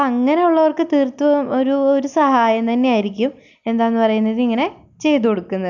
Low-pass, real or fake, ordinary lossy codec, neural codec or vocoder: 7.2 kHz; real; none; none